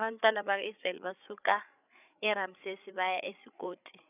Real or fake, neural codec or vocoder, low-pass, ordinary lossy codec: fake; codec, 16 kHz, 8 kbps, FreqCodec, larger model; 3.6 kHz; none